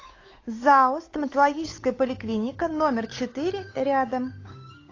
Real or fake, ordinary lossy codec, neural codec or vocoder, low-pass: fake; AAC, 32 kbps; codec, 16 kHz, 8 kbps, FunCodec, trained on Chinese and English, 25 frames a second; 7.2 kHz